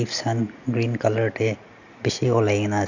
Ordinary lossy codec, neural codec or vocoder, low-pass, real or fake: none; none; 7.2 kHz; real